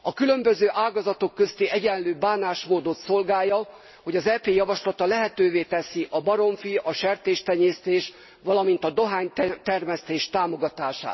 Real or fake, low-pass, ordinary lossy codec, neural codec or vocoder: real; 7.2 kHz; MP3, 24 kbps; none